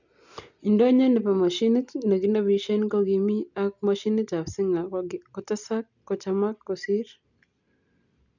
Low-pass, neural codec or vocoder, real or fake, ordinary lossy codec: 7.2 kHz; none; real; none